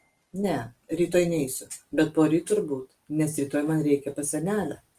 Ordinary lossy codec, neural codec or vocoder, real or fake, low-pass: Opus, 24 kbps; none; real; 14.4 kHz